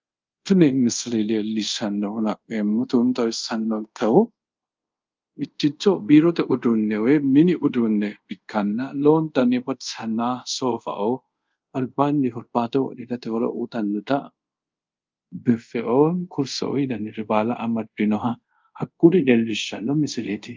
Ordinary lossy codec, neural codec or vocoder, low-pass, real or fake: Opus, 24 kbps; codec, 24 kHz, 0.5 kbps, DualCodec; 7.2 kHz; fake